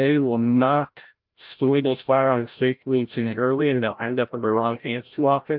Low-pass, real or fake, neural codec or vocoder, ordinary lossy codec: 5.4 kHz; fake; codec, 16 kHz, 0.5 kbps, FreqCodec, larger model; Opus, 32 kbps